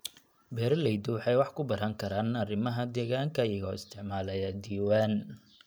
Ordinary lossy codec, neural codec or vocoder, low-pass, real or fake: none; none; none; real